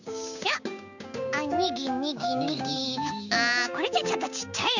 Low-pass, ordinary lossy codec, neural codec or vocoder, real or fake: 7.2 kHz; none; codec, 16 kHz, 6 kbps, DAC; fake